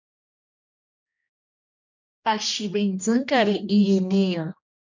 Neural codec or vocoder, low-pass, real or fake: codec, 16 kHz, 1 kbps, X-Codec, HuBERT features, trained on general audio; 7.2 kHz; fake